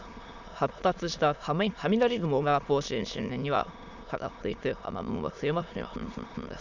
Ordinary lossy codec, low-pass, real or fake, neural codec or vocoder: none; 7.2 kHz; fake; autoencoder, 22.05 kHz, a latent of 192 numbers a frame, VITS, trained on many speakers